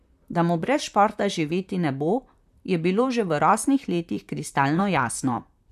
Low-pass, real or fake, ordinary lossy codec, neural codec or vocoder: 14.4 kHz; fake; none; vocoder, 44.1 kHz, 128 mel bands, Pupu-Vocoder